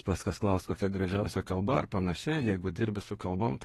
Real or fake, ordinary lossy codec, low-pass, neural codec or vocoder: fake; AAC, 32 kbps; 14.4 kHz; codec, 32 kHz, 1.9 kbps, SNAC